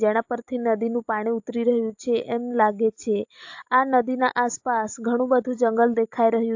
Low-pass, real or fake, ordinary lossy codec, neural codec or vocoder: 7.2 kHz; real; AAC, 48 kbps; none